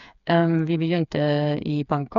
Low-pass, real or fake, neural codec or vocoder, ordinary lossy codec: 7.2 kHz; fake; codec, 16 kHz, 4 kbps, FreqCodec, smaller model; none